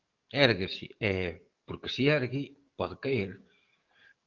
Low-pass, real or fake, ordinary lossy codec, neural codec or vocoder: 7.2 kHz; fake; Opus, 24 kbps; codec, 44.1 kHz, 7.8 kbps, DAC